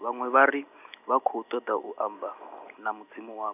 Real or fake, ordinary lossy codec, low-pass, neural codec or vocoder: real; none; 3.6 kHz; none